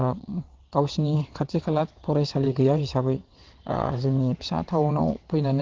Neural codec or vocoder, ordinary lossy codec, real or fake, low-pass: vocoder, 22.05 kHz, 80 mel bands, WaveNeXt; Opus, 16 kbps; fake; 7.2 kHz